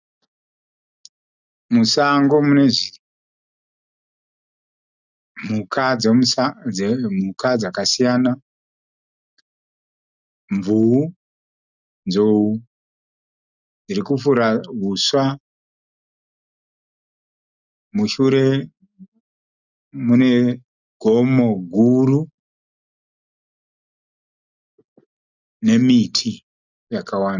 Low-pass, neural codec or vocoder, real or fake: 7.2 kHz; none; real